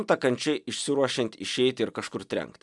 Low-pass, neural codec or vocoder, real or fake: 10.8 kHz; none; real